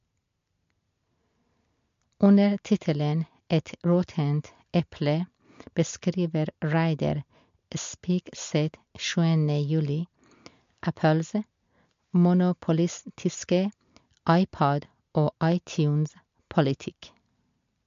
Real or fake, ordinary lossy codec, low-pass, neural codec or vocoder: real; MP3, 48 kbps; 7.2 kHz; none